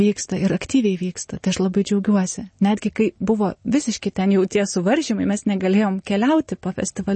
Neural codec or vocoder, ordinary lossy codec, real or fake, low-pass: none; MP3, 32 kbps; real; 10.8 kHz